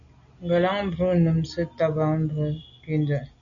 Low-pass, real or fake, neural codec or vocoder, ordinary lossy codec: 7.2 kHz; real; none; MP3, 96 kbps